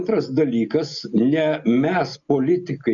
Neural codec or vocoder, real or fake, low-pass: none; real; 7.2 kHz